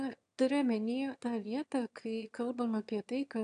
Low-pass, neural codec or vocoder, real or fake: 9.9 kHz; autoencoder, 22.05 kHz, a latent of 192 numbers a frame, VITS, trained on one speaker; fake